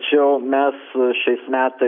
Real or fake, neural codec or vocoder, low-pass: real; none; 5.4 kHz